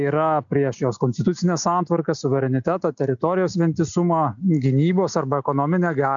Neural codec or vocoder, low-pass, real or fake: none; 7.2 kHz; real